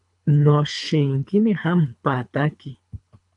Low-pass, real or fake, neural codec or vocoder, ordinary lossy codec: 10.8 kHz; fake; codec, 24 kHz, 3 kbps, HILCodec; AAC, 64 kbps